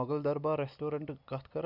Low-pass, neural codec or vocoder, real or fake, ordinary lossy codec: 5.4 kHz; none; real; Opus, 64 kbps